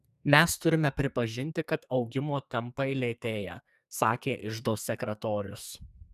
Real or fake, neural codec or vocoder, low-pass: fake; codec, 44.1 kHz, 2.6 kbps, SNAC; 14.4 kHz